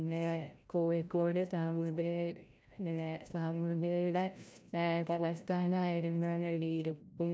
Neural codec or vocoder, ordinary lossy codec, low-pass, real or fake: codec, 16 kHz, 0.5 kbps, FreqCodec, larger model; none; none; fake